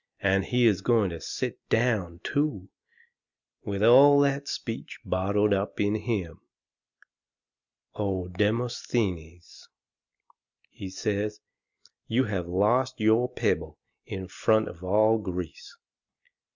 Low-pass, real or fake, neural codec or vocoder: 7.2 kHz; real; none